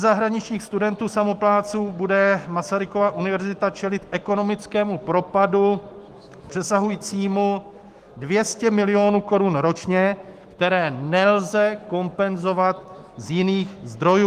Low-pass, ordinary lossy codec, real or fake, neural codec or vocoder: 14.4 kHz; Opus, 24 kbps; fake; autoencoder, 48 kHz, 128 numbers a frame, DAC-VAE, trained on Japanese speech